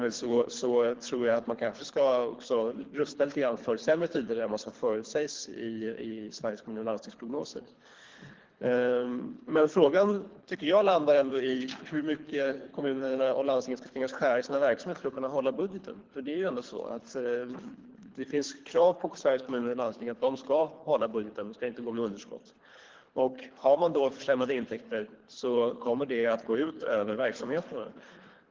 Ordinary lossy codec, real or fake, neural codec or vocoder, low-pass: Opus, 16 kbps; fake; codec, 24 kHz, 3 kbps, HILCodec; 7.2 kHz